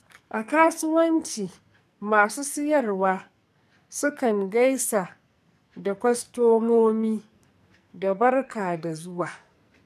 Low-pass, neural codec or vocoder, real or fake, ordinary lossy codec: 14.4 kHz; codec, 44.1 kHz, 2.6 kbps, SNAC; fake; none